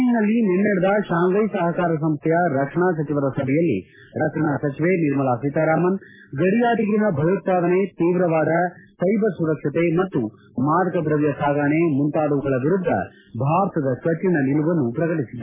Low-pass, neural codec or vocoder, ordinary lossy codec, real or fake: 3.6 kHz; none; MP3, 16 kbps; real